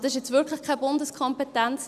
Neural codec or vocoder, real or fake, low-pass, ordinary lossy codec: none; real; 14.4 kHz; none